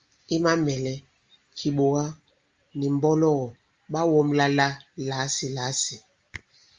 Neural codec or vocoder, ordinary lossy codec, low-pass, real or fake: none; Opus, 32 kbps; 7.2 kHz; real